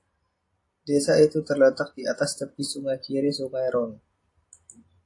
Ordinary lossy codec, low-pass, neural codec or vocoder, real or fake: AAC, 48 kbps; 10.8 kHz; none; real